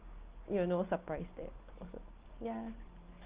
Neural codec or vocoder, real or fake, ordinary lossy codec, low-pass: none; real; Opus, 32 kbps; 3.6 kHz